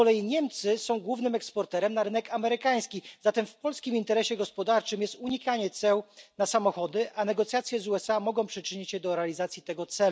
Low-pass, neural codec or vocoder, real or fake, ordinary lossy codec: none; none; real; none